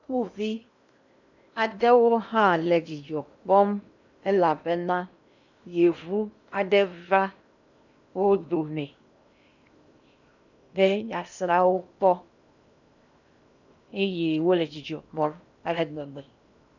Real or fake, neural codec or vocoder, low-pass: fake; codec, 16 kHz in and 24 kHz out, 0.8 kbps, FocalCodec, streaming, 65536 codes; 7.2 kHz